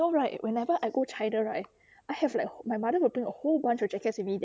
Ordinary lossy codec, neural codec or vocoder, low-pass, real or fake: none; none; none; real